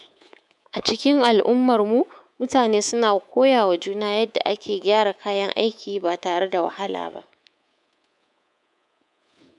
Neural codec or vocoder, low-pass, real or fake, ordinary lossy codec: codec, 24 kHz, 3.1 kbps, DualCodec; 10.8 kHz; fake; none